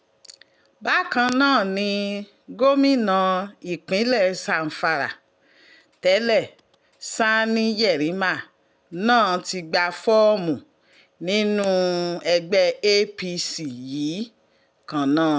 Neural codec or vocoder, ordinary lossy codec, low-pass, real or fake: none; none; none; real